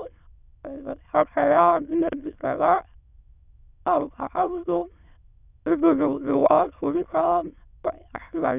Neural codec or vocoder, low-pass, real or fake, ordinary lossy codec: autoencoder, 22.05 kHz, a latent of 192 numbers a frame, VITS, trained on many speakers; 3.6 kHz; fake; none